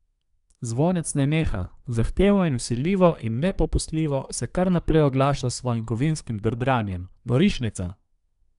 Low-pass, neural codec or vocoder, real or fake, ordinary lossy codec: 10.8 kHz; codec, 24 kHz, 1 kbps, SNAC; fake; none